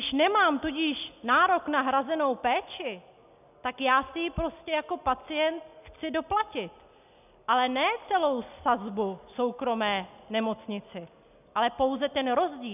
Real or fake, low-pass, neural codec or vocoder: real; 3.6 kHz; none